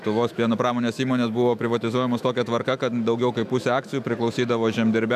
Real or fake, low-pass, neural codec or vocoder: fake; 14.4 kHz; autoencoder, 48 kHz, 128 numbers a frame, DAC-VAE, trained on Japanese speech